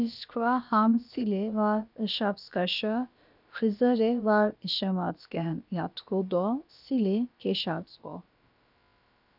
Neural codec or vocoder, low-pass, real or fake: codec, 16 kHz, about 1 kbps, DyCAST, with the encoder's durations; 5.4 kHz; fake